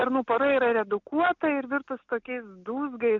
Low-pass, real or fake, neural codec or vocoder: 7.2 kHz; real; none